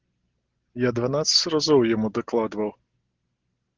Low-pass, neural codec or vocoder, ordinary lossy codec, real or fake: 7.2 kHz; none; Opus, 16 kbps; real